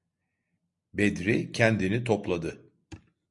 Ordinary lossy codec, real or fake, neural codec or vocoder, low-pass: MP3, 64 kbps; real; none; 10.8 kHz